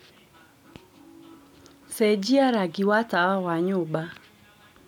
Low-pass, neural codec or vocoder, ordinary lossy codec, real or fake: 19.8 kHz; none; none; real